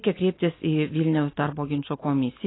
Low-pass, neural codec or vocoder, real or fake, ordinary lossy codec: 7.2 kHz; none; real; AAC, 16 kbps